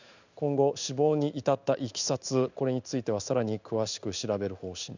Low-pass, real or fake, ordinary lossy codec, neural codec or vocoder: 7.2 kHz; fake; none; codec, 16 kHz in and 24 kHz out, 1 kbps, XY-Tokenizer